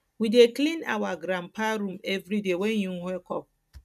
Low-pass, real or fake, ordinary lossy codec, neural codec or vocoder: 14.4 kHz; real; none; none